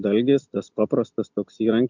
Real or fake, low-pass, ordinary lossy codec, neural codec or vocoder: real; 7.2 kHz; MP3, 64 kbps; none